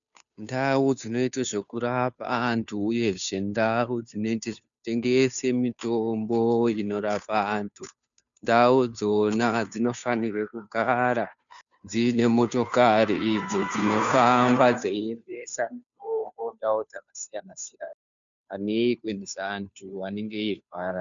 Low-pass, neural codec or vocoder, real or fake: 7.2 kHz; codec, 16 kHz, 2 kbps, FunCodec, trained on Chinese and English, 25 frames a second; fake